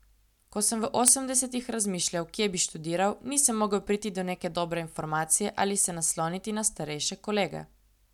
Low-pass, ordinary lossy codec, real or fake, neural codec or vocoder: 19.8 kHz; none; real; none